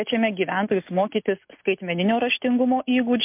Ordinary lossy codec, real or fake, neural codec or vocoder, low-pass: MP3, 32 kbps; real; none; 3.6 kHz